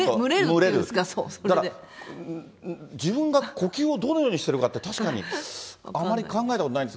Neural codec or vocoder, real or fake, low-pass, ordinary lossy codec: none; real; none; none